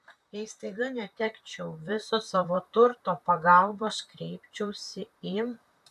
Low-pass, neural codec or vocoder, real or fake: 14.4 kHz; vocoder, 44.1 kHz, 128 mel bands, Pupu-Vocoder; fake